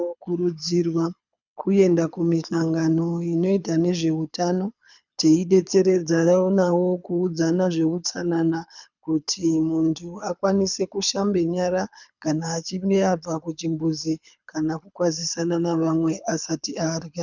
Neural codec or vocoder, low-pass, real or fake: codec, 24 kHz, 6 kbps, HILCodec; 7.2 kHz; fake